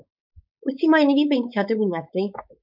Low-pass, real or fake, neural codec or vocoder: 5.4 kHz; fake; codec, 16 kHz, 4.8 kbps, FACodec